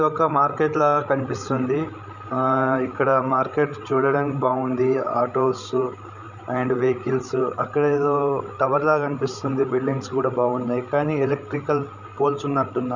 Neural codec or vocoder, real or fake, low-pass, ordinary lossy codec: codec, 16 kHz, 16 kbps, FreqCodec, larger model; fake; 7.2 kHz; none